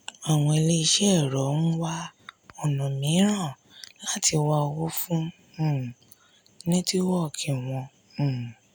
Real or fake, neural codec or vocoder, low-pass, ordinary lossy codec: real; none; none; none